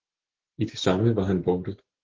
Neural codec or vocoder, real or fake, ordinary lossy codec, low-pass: none; real; Opus, 16 kbps; 7.2 kHz